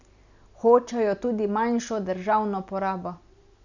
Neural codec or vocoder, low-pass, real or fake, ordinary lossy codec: none; 7.2 kHz; real; none